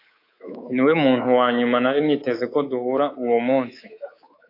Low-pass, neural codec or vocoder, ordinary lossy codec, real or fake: 5.4 kHz; codec, 24 kHz, 3.1 kbps, DualCodec; AAC, 32 kbps; fake